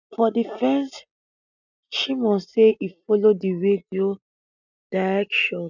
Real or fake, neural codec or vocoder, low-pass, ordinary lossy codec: real; none; 7.2 kHz; none